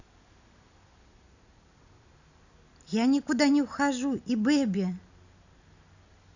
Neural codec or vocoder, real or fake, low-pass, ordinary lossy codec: none; real; 7.2 kHz; none